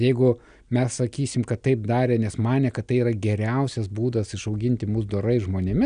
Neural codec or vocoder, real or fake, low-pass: none; real; 9.9 kHz